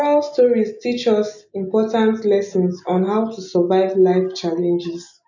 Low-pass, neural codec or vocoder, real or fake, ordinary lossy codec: 7.2 kHz; none; real; none